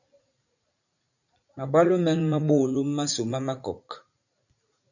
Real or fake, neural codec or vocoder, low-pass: fake; vocoder, 44.1 kHz, 80 mel bands, Vocos; 7.2 kHz